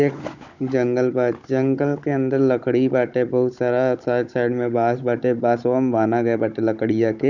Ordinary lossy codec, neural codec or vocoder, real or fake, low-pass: none; codec, 16 kHz, 16 kbps, FunCodec, trained on Chinese and English, 50 frames a second; fake; 7.2 kHz